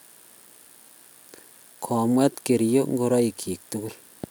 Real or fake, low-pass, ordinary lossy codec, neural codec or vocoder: real; none; none; none